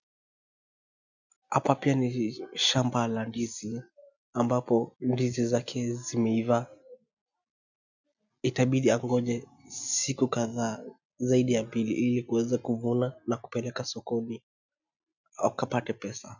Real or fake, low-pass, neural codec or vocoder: real; 7.2 kHz; none